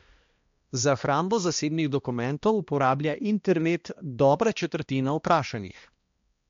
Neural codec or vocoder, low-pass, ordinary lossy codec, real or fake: codec, 16 kHz, 1 kbps, X-Codec, HuBERT features, trained on balanced general audio; 7.2 kHz; MP3, 48 kbps; fake